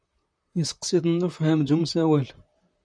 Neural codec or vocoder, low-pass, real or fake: vocoder, 44.1 kHz, 128 mel bands, Pupu-Vocoder; 9.9 kHz; fake